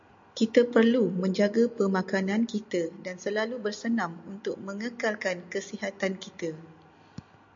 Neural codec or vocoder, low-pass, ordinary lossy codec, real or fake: none; 7.2 kHz; MP3, 48 kbps; real